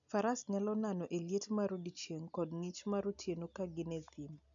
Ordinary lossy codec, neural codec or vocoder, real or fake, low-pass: MP3, 96 kbps; none; real; 7.2 kHz